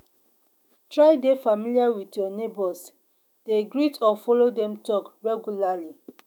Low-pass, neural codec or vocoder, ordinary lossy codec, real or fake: 19.8 kHz; autoencoder, 48 kHz, 128 numbers a frame, DAC-VAE, trained on Japanese speech; none; fake